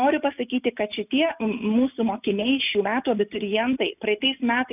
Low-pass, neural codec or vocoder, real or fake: 3.6 kHz; none; real